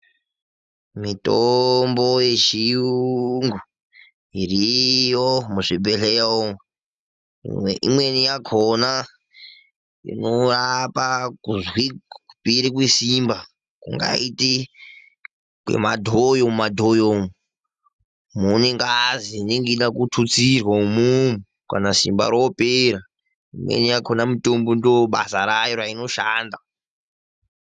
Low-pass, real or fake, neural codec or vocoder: 10.8 kHz; real; none